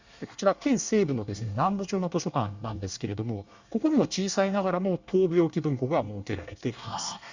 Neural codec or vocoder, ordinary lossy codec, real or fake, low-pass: codec, 24 kHz, 1 kbps, SNAC; Opus, 64 kbps; fake; 7.2 kHz